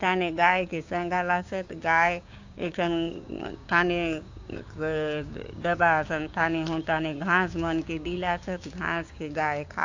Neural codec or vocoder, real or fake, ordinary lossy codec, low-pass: codec, 16 kHz, 6 kbps, DAC; fake; AAC, 48 kbps; 7.2 kHz